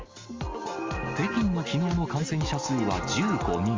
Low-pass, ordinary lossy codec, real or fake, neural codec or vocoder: 7.2 kHz; Opus, 32 kbps; real; none